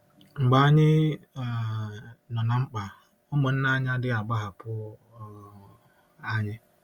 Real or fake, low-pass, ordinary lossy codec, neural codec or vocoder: real; 19.8 kHz; Opus, 64 kbps; none